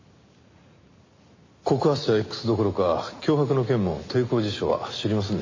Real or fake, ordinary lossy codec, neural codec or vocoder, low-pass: real; MP3, 32 kbps; none; 7.2 kHz